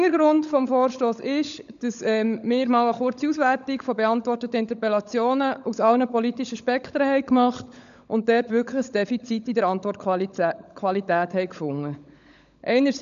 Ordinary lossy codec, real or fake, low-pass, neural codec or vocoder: none; fake; 7.2 kHz; codec, 16 kHz, 16 kbps, FunCodec, trained on LibriTTS, 50 frames a second